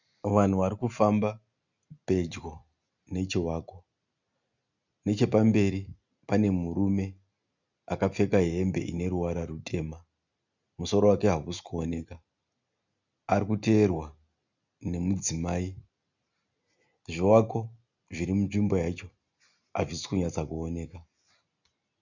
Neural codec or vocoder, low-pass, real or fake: none; 7.2 kHz; real